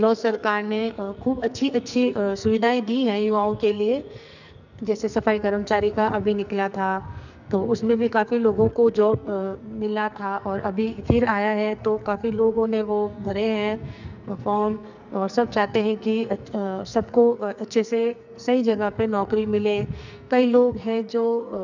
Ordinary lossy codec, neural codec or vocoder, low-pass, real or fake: none; codec, 44.1 kHz, 2.6 kbps, SNAC; 7.2 kHz; fake